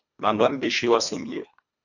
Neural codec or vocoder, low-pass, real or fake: codec, 24 kHz, 1.5 kbps, HILCodec; 7.2 kHz; fake